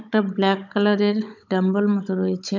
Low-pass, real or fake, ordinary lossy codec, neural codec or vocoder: 7.2 kHz; fake; none; codec, 16 kHz, 16 kbps, FunCodec, trained on Chinese and English, 50 frames a second